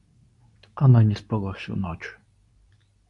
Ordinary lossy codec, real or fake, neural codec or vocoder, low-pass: AAC, 64 kbps; fake; codec, 24 kHz, 0.9 kbps, WavTokenizer, medium speech release version 2; 10.8 kHz